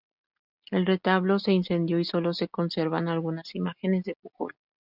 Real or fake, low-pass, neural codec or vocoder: real; 5.4 kHz; none